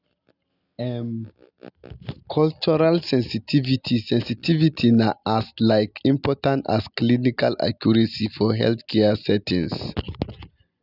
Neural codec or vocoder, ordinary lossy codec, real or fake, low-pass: none; none; real; 5.4 kHz